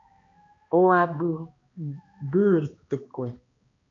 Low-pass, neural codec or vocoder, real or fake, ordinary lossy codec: 7.2 kHz; codec, 16 kHz, 1 kbps, X-Codec, HuBERT features, trained on balanced general audio; fake; AAC, 32 kbps